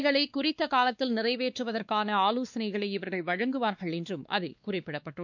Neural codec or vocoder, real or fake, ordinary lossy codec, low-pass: codec, 16 kHz, 2 kbps, X-Codec, WavLM features, trained on Multilingual LibriSpeech; fake; none; 7.2 kHz